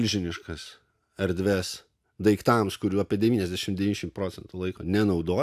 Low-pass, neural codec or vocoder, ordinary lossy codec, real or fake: 14.4 kHz; none; AAC, 96 kbps; real